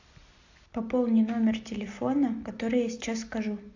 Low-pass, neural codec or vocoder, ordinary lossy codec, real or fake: 7.2 kHz; none; AAC, 48 kbps; real